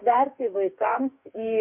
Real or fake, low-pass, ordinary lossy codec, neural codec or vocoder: fake; 3.6 kHz; MP3, 32 kbps; codec, 44.1 kHz, 2.6 kbps, DAC